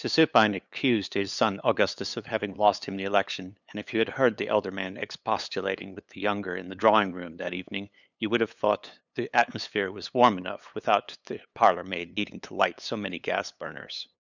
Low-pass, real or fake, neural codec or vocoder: 7.2 kHz; fake; codec, 16 kHz, 8 kbps, FunCodec, trained on LibriTTS, 25 frames a second